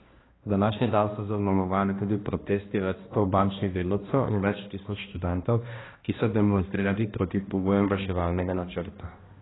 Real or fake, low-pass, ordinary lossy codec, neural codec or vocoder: fake; 7.2 kHz; AAC, 16 kbps; codec, 16 kHz, 1 kbps, X-Codec, HuBERT features, trained on balanced general audio